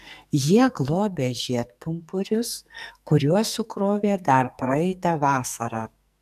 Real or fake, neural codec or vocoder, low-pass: fake; codec, 44.1 kHz, 2.6 kbps, SNAC; 14.4 kHz